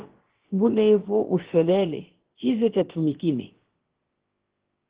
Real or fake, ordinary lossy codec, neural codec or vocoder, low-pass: fake; Opus, 16 kbps; codec, 16 kHz, about 1 kbps, DyCAST, with the encoder's durations; 3.6 kHz